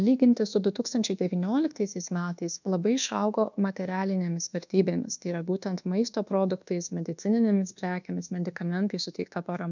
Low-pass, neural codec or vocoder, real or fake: 7.2 kHz; codec, 24 kHz, 1.2 kbps, DualCodec; fake